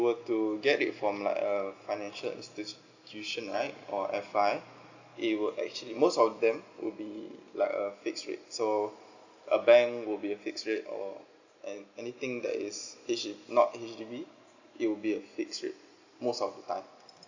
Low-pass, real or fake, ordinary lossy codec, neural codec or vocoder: 7.2 kHz; real; Opus, 64 kbps; none